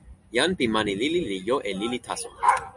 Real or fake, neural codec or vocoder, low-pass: real; none; 10.8 kHz